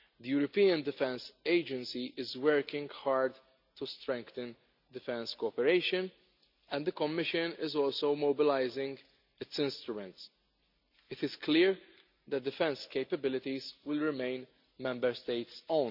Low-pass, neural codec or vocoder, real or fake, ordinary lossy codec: 5.4 kHz; none; real; none